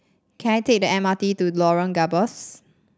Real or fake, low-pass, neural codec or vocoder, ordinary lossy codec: real; none; none; none